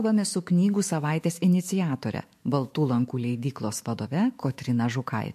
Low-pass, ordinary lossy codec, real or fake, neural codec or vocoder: 14.4 kHz; MP3, 64 kbps; fake; autoencoder, 48 kHz, 128 numbers a frame, DAC-VAE, trained on Japanese speech